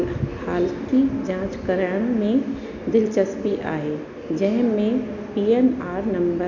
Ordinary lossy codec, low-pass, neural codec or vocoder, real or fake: none; 7.2 kHz; none; real